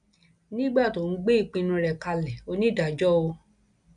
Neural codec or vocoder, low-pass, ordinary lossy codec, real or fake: none; 9.9 kHz; none; real